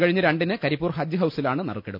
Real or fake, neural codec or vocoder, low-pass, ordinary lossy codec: real; none; 5.4 kHz; none